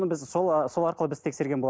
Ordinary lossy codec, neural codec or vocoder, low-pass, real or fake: none; none; none; real